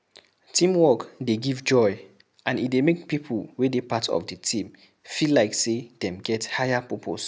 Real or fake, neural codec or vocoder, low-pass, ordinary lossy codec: real; none; none; none